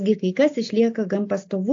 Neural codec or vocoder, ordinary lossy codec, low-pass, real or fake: none; AAC, 48 kbps; 7.2 kHz; real